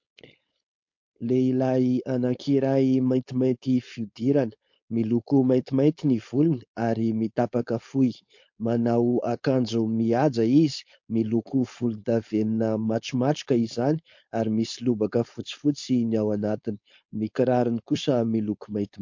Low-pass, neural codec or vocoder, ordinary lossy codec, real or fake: 7.2 kHz; codec, 16 kHz, 4.8 kbps, FACodec; MP3, 48 kbps; fake